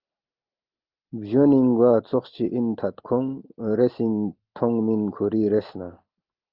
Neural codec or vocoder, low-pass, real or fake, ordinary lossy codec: none; 5.4 kHz; real; Opus, 32 kbps